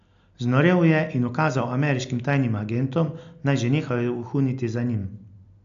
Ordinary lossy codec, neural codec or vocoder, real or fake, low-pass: AAC, 64 kbps; none; real; 7.2 kHz